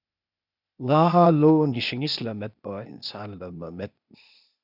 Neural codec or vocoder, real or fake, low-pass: codec, 16 kHz, 0.8 kbps, ZipCodec; fake; 5.4 kHz